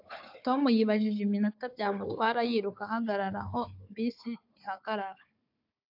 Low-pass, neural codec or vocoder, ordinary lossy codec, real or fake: 5.4 kHz; codec, 16 kHz, 4 kbps, FunCodec, trained on Chinese and English, 50 frames a second; AAC, 48 kbps; fake